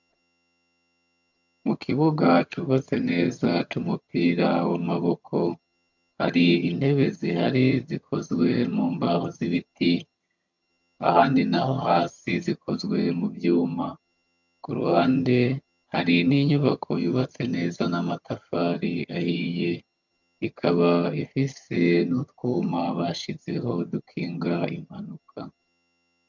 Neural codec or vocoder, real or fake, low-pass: vocoder, 22.05 kHz, 80 mel bands, HiFi-GAN; fake; 7.2 kHz